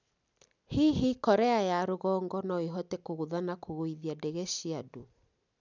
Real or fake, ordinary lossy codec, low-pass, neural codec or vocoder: real; none; 7.2 kHz; none